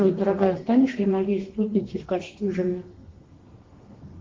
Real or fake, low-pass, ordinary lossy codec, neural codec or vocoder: fake; 7.2 kHz; Opus, 16 kbps; codec, 32 kHz, 1.9 kbps, SNAC